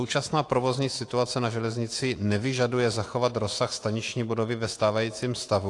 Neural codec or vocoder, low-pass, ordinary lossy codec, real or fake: autoencoder, 48 kHz, 128 numbers a frame, DAC-VAE, trained on Japanese speech; 10.8 kHz; AAC, 48 kbps; fake